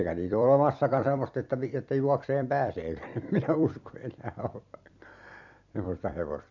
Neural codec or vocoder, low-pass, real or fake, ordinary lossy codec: vocoder, 44.1 kHz, 128 mel bands every 256 samples, BigVGAN v2; 7.2 kHz; fake; MP3, 48 kbps